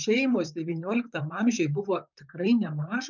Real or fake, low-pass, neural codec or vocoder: fake; 7.2 kHz; vocoder, 44.1 kHz, 128 mel bands, Pupu-Vocoder